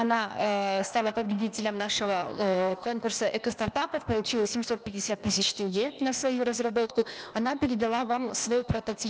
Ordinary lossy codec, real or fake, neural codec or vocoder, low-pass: none; fake; codec, 16 kHz, 0.8 kbps, ZipCodec; none